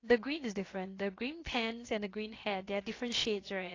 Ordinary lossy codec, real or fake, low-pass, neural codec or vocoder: AAC, 32 kbps; fake; 7.2 kHz; codec, 16 kHz, about 1 kbps, DyCAST, with the encoder's durations